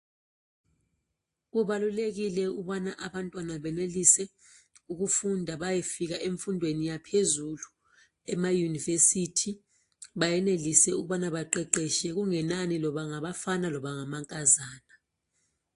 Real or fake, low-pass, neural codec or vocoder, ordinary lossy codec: real; 10.8 kHz; none; AAC, 48 kbps